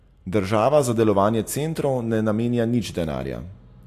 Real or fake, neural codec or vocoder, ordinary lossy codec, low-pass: real; none; AAC, 64 kbps; 14.4 kHz